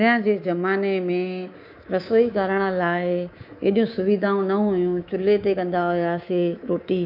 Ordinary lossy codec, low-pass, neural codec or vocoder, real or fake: none; 5.4 kHz; codec, 24 kHz, 3.1 kbps, DualCodec; fake